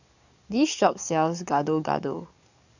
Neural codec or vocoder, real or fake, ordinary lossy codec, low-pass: codec, 44.1 kHz, 7.8 kbps, DAC; fake; none; 7.2 kHz